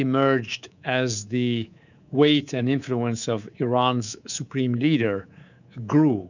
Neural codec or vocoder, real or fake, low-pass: codec, 24 kHz, 3.1 kbps, DualCodec; fake; 7.2 kHz